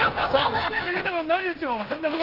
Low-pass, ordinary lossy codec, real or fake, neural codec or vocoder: 5.4 kHz; Opus, 32 kbps; fake; codec, 16 kHz in and 24 kHz out, 0.9 kbps, LongCat-Audio-Codec, fine tuned four codebook decoder